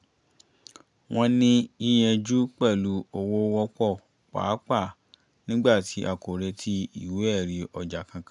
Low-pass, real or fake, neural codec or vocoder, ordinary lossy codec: 10.8 kHz; real; none; MP3, 96 kbps